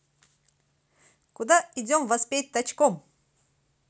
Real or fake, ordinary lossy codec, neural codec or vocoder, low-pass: real; none; none; none